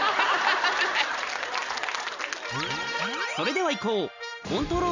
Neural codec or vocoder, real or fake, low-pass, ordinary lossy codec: none; real; 7.2 kHz; none